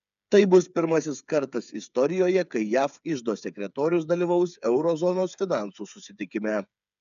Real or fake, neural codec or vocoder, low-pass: fake; codec, 16 kHz, 8 kbps, FreqCodec, smaller model; 7.2 kHz